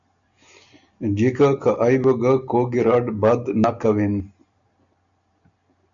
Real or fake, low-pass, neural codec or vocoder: real; 7.2 kHz; none